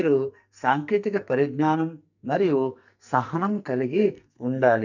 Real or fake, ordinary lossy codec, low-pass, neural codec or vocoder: fake; none; 7.2 kHz; codec, 44.1 kHz, 2.6 kbps, SNAC